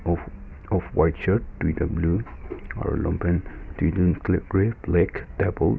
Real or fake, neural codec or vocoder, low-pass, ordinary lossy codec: real; none; none; none